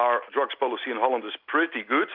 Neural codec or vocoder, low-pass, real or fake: none; 5.4 kHz; real